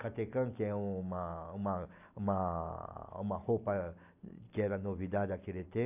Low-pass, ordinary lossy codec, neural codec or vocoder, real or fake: 3.6 kHz; AAC, 32 kbps; none; real